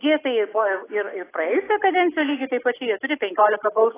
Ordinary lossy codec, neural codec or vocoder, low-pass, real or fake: AAC, 16 kbps; none; 3.6 kHz; real